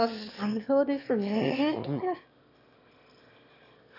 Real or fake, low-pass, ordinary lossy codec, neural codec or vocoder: fake; 5.4 kHz; none; autoencoder, 22.05 kHz, a latent of 192 numbers a frame, VITS, trained on one speaker